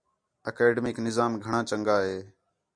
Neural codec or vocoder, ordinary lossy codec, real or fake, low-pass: none; Opus, 64 kbps; real; 9.9 kHz